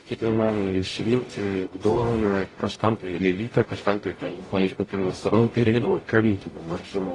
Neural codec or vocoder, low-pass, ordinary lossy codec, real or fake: codec, 44.1 kHz, 0.9 kbps, DAC; 10.8 kHz; AAC, 32 kbps; fake